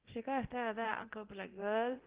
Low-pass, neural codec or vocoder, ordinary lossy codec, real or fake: 3.6 kHz; codec, 24 kHz, 0.9 kbps, DualCodec; Opus, 16 kbps; fake